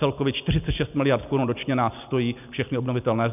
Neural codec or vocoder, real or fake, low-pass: none; real; 3.6 kHz